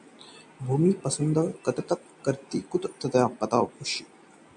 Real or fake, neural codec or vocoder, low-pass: real; none; 9.9 kHz